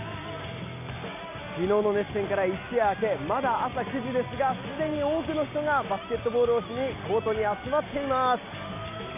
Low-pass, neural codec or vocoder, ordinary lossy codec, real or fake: 3.6 kHz; none; MP3, 32 kbps; real